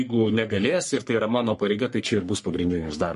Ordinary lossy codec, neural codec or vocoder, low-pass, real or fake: MP3, 48 kbps; codec, 44.1 kHz, 3.4 kbps, Pupu-Codec; 14.4 kHz; fake